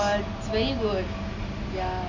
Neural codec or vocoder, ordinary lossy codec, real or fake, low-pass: none; none; real; 7.2 kHz